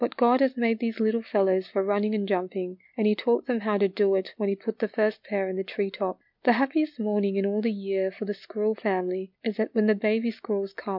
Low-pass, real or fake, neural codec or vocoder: 5.4 kHz; real; none